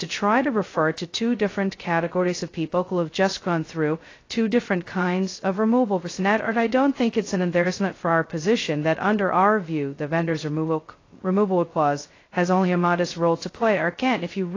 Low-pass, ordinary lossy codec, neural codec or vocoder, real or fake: 7.2 kHz; AAC, 32 kbps; codec, 16 kHz, 0.2 kbps, FocalCodec; fake